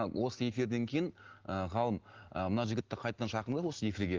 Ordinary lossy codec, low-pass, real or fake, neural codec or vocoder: Opus, 16 kbps; 7.2 kHz; real; none